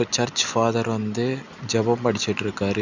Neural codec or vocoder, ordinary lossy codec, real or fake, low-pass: none; none; real; 7.2 kHz